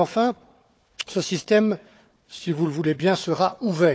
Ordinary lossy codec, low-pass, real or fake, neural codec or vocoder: none; none; fake; codec, 16 kHz, 4 kbps, FunCodec, trained on Chinese and English, 50 frames a second